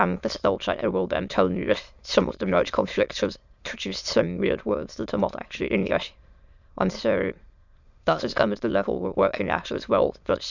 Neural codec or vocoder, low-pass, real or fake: autoencoder, 22.05 kHz, a latent of 192 numbers a frame, VITS, trained on many speakers; 7.2 kHz; fake